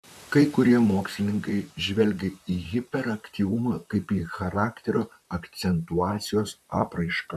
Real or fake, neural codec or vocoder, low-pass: fake; vocoder, 44.1 kHz, 128 mel bands, Pupu-Vocoder; 14.4 kHz